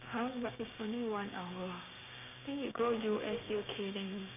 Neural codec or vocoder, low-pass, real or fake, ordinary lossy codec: codec, 16 kHz, 6 kbps, DAC; 3.6 kHz; fake; none